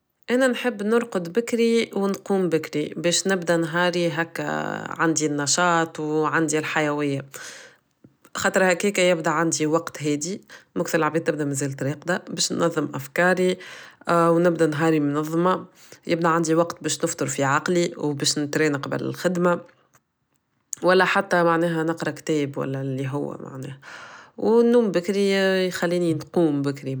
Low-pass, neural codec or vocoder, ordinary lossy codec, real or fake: none; none; none; real